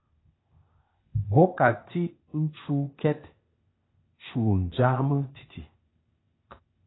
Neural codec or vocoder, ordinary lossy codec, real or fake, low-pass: codec, 16 kHz, 0.8 kbps, ZipCodec; AAC, 16 kbps; fake; 7.2 kHz